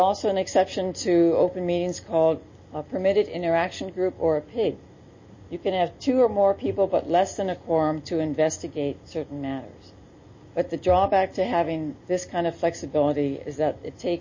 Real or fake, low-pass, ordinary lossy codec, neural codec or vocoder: real; 7.2 kHz; MP3, 32 kbps; none